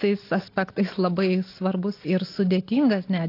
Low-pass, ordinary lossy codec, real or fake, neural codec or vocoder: 5.4 kHz; AAC, 32 kbps; real; none